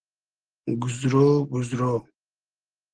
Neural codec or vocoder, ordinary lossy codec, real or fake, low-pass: none; Opus, 16 kbps; real; 9.9 kHz